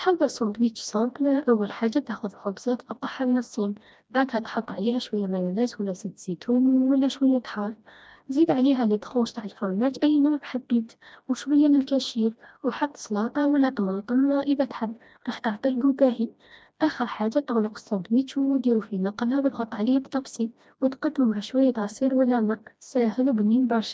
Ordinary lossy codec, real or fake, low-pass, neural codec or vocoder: none; fake; none; codec, 16 kHz, 1 kbps, FreqCodec, smaller model